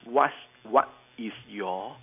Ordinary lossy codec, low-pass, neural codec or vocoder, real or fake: none; 3.6 kHz; none; real